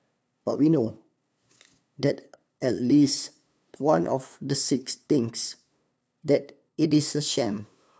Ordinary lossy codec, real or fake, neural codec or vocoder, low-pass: none; fake; codec, 16 kHz, 2 kbps, FunCodec, trained on LibriTTS, 25 frames a second; none